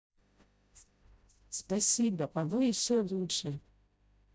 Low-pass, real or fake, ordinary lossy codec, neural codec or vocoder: none; fake; none; codec, 16 kHz, 0.5 kbps, FreqCodec, smaller model